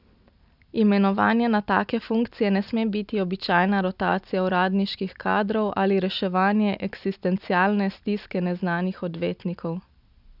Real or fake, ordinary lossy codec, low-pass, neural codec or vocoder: real; none; 5.4 kHz; none